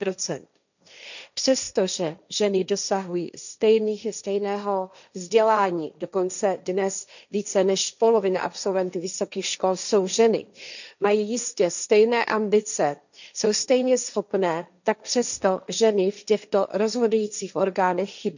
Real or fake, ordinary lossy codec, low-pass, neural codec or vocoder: fake; none; 7.2 kHz; codec, 16 kHz, 1.1 kbps, Voila-Tokenizer